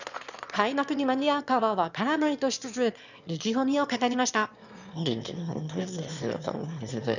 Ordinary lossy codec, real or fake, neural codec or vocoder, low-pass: none; fake; autoencoder, 22.05 kHz, a latent of 192 numbers a frame, VITS, trained on one speaker; 7.2 kHz